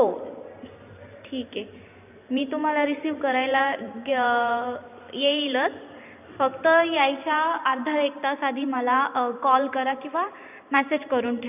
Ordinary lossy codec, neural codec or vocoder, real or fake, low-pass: none; vocoder, 44.1 kHz, 128 mel bands every 512 samples, BigVGAN v2; fake; 3.6 kHz